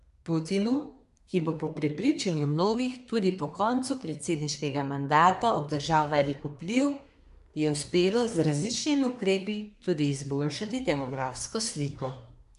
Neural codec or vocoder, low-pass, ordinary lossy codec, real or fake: codec, 24 kHz, 1 kbps, SNAC; 10.8 kHz; none; fake